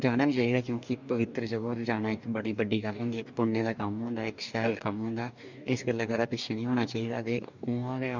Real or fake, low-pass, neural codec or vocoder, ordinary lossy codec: fake; 7.2 kHz; codec, 44.1 kHz, 2.6 kbps, DAC; none